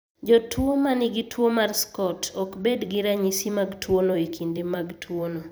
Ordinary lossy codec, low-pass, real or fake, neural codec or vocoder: none; none; fake; vocoder, 44.1 kHz, 128 mel bands every 256 samples, BigVGAN v2